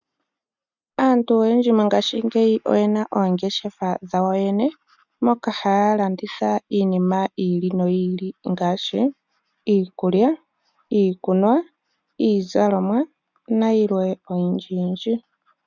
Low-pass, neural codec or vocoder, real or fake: 7.2 kHz; none; real